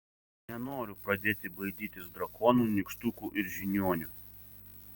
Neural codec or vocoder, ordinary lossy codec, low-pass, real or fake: vocoder, 44.1 kHz, 128 mel bands every 512 samples, BigVGAN v2; Opus, 64 kbps; 19.8 kHz; fake